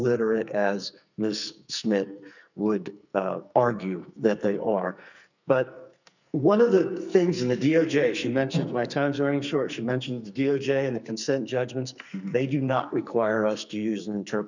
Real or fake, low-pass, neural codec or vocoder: fake; 7.2 kHz; codec, 44.1 kHz, 2.6 kbps, SNAC